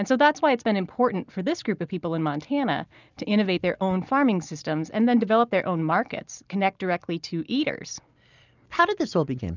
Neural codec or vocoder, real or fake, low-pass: none; real; 7.2 kHz